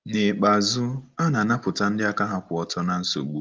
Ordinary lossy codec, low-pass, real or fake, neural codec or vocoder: Opus, 32 kbps; 7.2 kHz; real; none